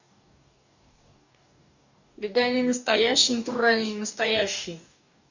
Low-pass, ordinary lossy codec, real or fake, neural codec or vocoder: 7.2 kHz; none; fake; codec, 44.1 kHz, 2.6 kbps, DAC